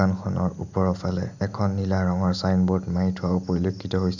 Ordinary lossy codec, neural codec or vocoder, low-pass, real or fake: none; none; 7.2 kHz; real